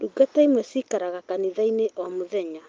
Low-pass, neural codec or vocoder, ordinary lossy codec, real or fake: 7.2 kHz; none; Opus, 24 kbps; real